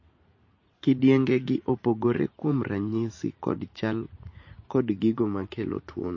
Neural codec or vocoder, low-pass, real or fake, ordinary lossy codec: vocoder, 44.1 kHz, 80 mel bands, Vocos; 7.2 kHz; fake; MP3, 32 kbps